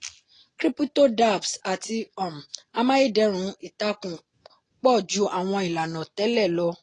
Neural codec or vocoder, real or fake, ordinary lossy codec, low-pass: none; real; AAC, 32 kbps; 9.9 kHz